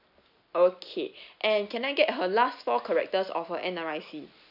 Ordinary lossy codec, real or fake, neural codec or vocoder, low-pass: none; real; none; 5.4 kHz